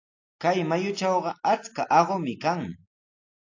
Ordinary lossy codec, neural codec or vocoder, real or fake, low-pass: AAC, 48 kbps; none; real; 7.2 kHz